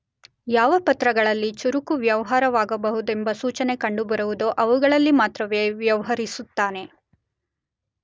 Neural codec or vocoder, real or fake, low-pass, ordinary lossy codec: none; real; none; none